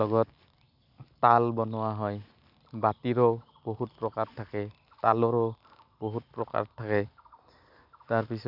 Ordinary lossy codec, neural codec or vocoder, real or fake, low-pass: none; none; real; 5.4 kHz